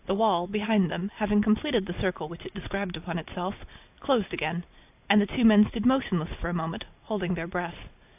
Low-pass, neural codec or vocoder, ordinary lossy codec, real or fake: 3.6 kHz; vocoder, 44.1 kHz, 128 mel bands every 256 samples, BigVGAN v2; AAC, 32 kbps; fake